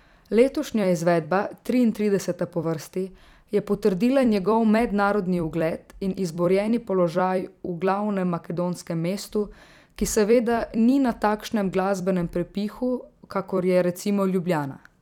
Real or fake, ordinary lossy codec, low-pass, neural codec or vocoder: fake; none; 19.8 kHz; vocoder, 44.1 kHz, 128 mel bands every 256 samples, BigVGAN v2